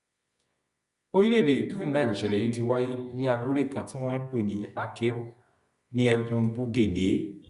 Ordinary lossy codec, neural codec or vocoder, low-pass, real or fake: Opus, 64 kbps; codec, 24 kHz, 0.9 kbps, WavTokenizer, medium music audio release; 10.8 kHz; fake